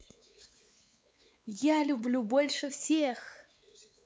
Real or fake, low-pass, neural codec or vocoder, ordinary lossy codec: fake; none; codec, 16 kHz, 4 kbps, X-Codec, WavLM features, trained on Multilingual LibriSpeech; none